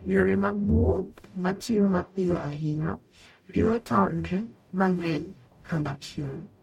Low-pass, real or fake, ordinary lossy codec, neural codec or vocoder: 19.8 kHz; fake; MP3, 64 kbps; codec, 44.1 kHz, 0.9 kbps, DAC